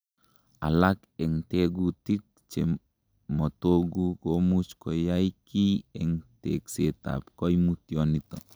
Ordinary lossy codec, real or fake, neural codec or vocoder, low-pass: none; real; none; none